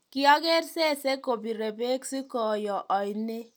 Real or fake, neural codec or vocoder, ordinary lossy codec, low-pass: real; none; none; none